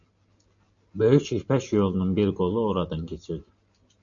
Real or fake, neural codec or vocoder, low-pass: real; none; 7.2 kHz